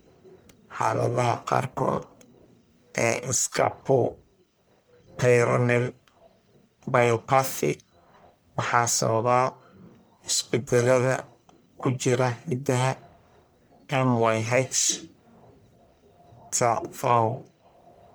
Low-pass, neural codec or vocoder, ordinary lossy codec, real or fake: none; codec, 44.1 kHz, 1.7 kbps, Pupu-Codec; none; fake